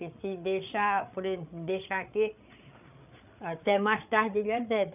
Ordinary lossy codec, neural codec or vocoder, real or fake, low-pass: none; codec, 16 kHz, 8 kbps, FreqCodec, larger model; fake; 3.6 kHz